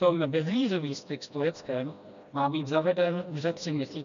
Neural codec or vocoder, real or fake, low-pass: codec, 16 kHz, 1 kbps, FreqCodec, smaller model; fake; 7.2 kHz